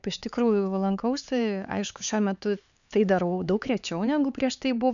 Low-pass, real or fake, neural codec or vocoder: 7.2 kHz; fake; codec, 16 kHz, 4 kbps, X-Codec, HuBERT features, trained on LibriSpeech